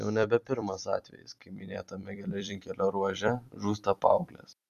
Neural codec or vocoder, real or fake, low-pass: vocoder, 44.1 kHz, 128 mel bands every 256 samples, BigVGAN v2; fake; 14.4 kHz